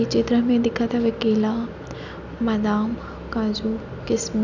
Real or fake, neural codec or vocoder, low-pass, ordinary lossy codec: real; none; 7.2 kHz; none